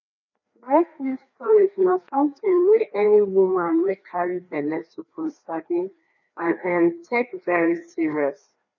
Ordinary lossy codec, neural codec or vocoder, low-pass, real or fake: none; codec, 16 kHz, 2 kbps, FreqCodec, larger model; 7.2 kHz; fake